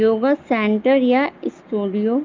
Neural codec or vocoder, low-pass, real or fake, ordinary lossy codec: none; 7.2 kHz; real; Opus, 32 kbps